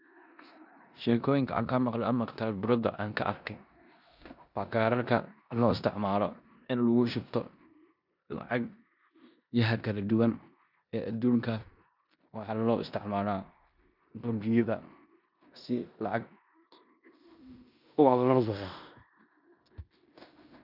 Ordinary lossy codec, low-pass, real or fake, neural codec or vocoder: none; 5.4 kHz; fake; codec, 16 kHz in and 24 kHz out, 0.9 kbps, LongCat-Audio-Codec, four codebook decoder